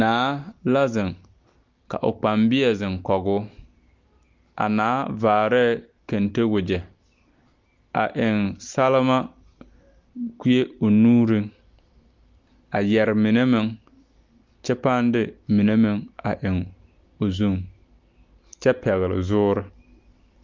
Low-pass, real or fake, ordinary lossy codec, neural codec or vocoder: 7.2 kHz; real; Opus, 24 kbps; none